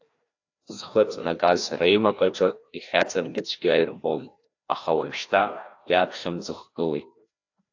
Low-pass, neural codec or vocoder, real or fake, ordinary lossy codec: 7.2 kHz; codec, 16 kHz, 1 kbps, FreqCodec, larger model; fake; AAC, 48 kbps